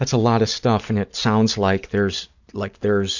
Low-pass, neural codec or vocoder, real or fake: 7.2 kHz; none; real